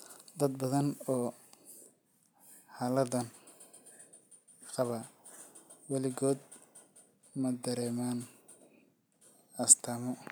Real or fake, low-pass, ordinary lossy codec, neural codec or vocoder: real; none; none; none